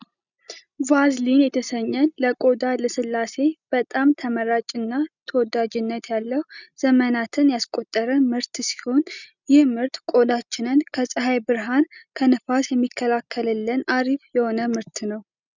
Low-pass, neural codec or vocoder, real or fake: 7.2 kHz; none; real